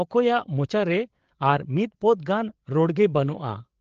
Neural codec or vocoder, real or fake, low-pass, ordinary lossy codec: codec, 16 kHz, 8 kbps, FreqCodec, larger model; fake; 7.2 kHz; Opus, 16 kbps